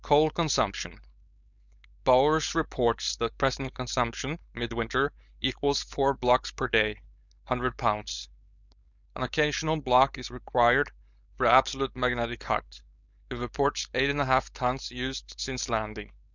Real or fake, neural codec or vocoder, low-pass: fake; codec, 16 kHz, 4.8 kbps, FACodec; 7.2 kHz